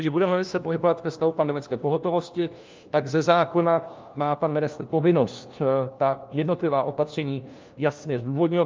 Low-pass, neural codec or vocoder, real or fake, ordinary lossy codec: 7.2 kHz; codec, 16 kHz, 1 kbps, FunCodec, trained on LibriTTS, 50 frames a second; fake; Opus, 24 kbps